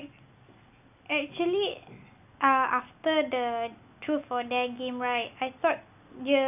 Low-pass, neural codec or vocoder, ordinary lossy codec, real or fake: 3.6 kHz; none; none; real